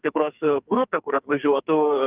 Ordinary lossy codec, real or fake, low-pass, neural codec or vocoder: Opus, 32 kbps; fake; 3.6 kHz; codec, 44.1 kHz, 2.6 kbps, SNAC